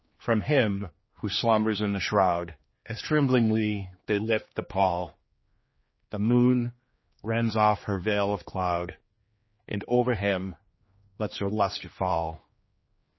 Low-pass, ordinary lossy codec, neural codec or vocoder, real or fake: 7.2 kHz; MP3, 24 kbps; codec, 16 kHz, 2 kbps, X-Codec, HuBERT features, trained on general audio; fake